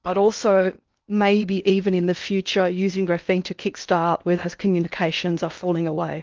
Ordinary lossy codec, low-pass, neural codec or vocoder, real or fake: Opus, 24 kbps; 7.2 kHz; codec, 16 kHz in and 24 kHz out, 0.8 kbps, FocalCodec, streaming, 65536 codes; fake